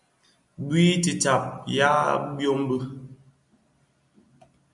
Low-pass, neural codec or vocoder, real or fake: 10.8 kHz; none; real